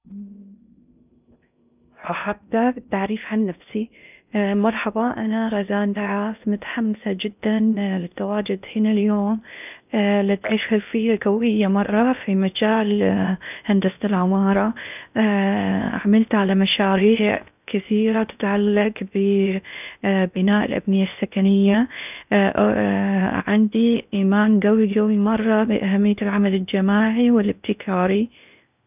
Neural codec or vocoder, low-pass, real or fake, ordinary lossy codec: codec, 16 kHz in and 24 kHz out, 0.6 kbps, FocalCodec, streaming, 2048 codes; 3.6 kHz; fake; none